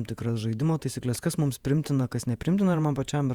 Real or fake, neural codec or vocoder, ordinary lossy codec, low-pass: fake; vocoder, 48 kHz, 128 mel bands, Vocos; Opus, 64 kbps; 19.8 kHz